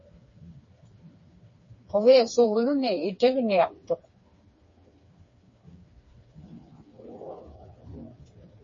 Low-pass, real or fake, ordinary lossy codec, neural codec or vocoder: 7.2 kHz; fake; MP3, 32 kbps; codec, 16 kHz, 4 kbps, FreqCodec, smaller model